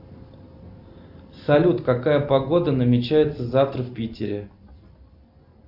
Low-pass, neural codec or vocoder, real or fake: 5.4 kHz; none; real